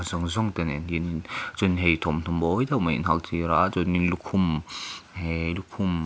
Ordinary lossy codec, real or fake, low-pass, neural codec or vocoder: none; real; none; none